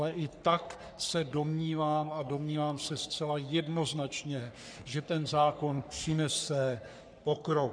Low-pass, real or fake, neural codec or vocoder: 9.9 kHz; fake; codec, 44.1 kHz, 3.4 kbps, Pupu-Codec